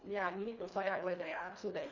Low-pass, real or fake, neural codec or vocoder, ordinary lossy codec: 7.2 kHz; fake; codec, 24 kHz, 1.5 kbps, HILCodec; none